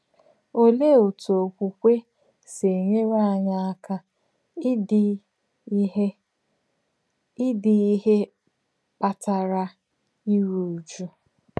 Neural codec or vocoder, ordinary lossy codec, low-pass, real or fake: none; none; none; real